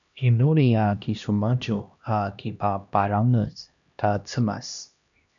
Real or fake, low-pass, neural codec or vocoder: fake; 7.2 kHz; codec, 16 kHz, 1 kbps, X-Codec, HuBERT features, trained on LibriSpeech